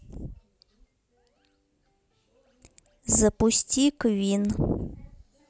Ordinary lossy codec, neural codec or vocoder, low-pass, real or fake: none; none; none; real